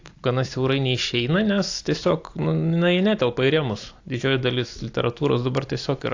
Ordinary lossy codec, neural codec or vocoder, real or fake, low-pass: AAC, 48 kbps; none; real; 7.2 kHz